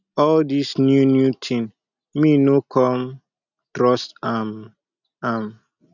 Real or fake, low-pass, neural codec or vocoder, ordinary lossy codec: real; 7.2 kHz; none; none